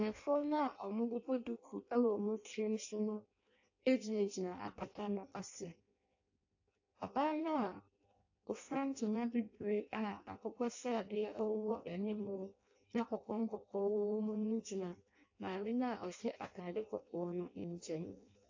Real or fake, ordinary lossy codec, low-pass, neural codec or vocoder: fake; AAC, 48 kbps; 7.2 kHz; codec, 16 kHz in and 24 kHz out, 0.6 kbps, FireRedTTS-2 codec